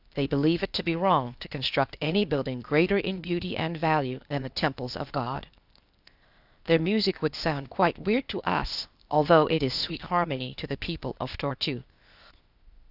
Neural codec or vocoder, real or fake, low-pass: codec, 16 kHz, 0.8 kbps, ZipCodec; fake; 5.4 kHz